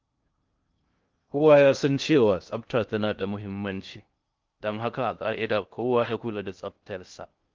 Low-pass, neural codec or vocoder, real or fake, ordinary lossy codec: 7.2 kHz; codec, 16 kHz in and 24 kHz out, 0.6 kbps, FocalCodec, streaming, 2048 codes; fake; Opus, 24 kbps